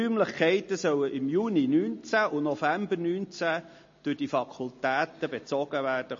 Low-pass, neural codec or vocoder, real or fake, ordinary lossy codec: 7.2 kHz; none; real; MP3, 32 kbps